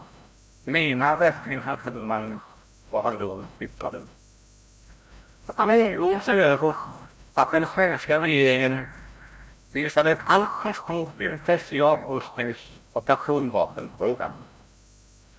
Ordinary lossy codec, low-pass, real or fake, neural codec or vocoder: none; none; fake; codec, 16 kHz, 0.5 kbps, FreqCodec, larger model